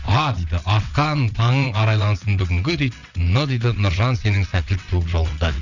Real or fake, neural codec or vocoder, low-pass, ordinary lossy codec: fake; vocoder, 22.05 kHz, 80 mel bands, WaveNeXt; 7.2 kHz; none